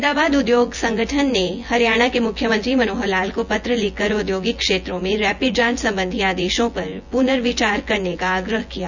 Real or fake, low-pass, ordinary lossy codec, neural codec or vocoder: fake; 7.2 kHz; none; vocoder, 24 kHz, 100 mel bands, Vocos